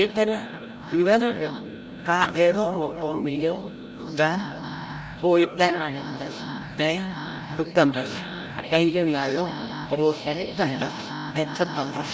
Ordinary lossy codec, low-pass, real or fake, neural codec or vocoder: none; none; fake; codec, 16 kHz, 0.5 kbps, FreqCodec, larger model